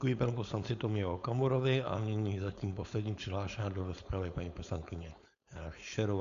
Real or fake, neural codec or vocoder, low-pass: fake; codec, 16 kHz, 4.8 kbps, FACodec; 7.2 kHz